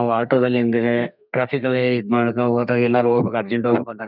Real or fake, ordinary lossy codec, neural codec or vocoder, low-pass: fake; none; codec, 32 kHz, 1.9 kbps, SNAC; 5.4 kHz